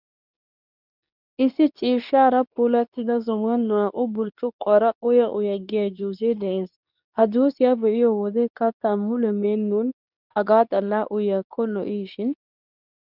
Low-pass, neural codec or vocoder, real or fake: 5.4 kHz; codec, 24 kHz, 0.9 kbps, WavTokenizer, medium speech release version 2; fake